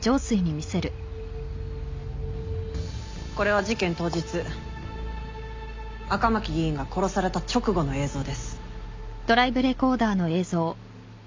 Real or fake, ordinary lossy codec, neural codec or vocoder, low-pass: real; MP3, 48 kbps; none; 7.2 kHz